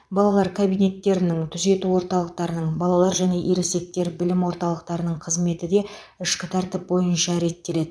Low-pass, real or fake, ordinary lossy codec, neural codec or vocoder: none; fake; none; vocoder, 22.05 kHz, 80 mel bands, Vocos